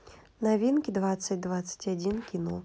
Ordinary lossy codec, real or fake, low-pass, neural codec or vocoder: none; real; none; none